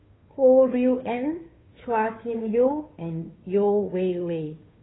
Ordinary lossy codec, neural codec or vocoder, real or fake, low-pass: AAC, 16 kbps; codec, 16 kHz, 2 kbps, FunCodec, trained on Chinese and English, 25 frames a second; fake; 7.2 kHz